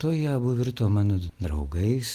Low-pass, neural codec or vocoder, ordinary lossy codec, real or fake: 14.4 kHz; none; Opus, 24 kbps; real